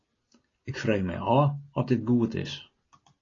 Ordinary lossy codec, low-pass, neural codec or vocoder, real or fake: AAC, 32 kbps; 7.2 kHz; none; real